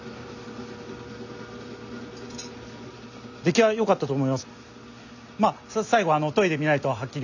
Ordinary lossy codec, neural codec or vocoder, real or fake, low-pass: none; none; real; 7.2 kHz